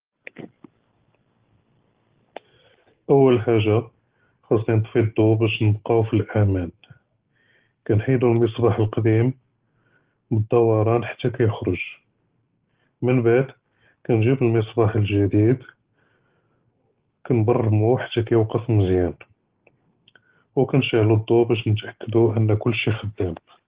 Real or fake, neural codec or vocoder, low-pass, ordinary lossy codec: real; none; 3.6 kHz; Opus, 16 kbps